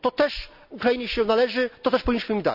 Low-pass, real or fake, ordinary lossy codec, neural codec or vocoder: 5.4 kHz; real; none; none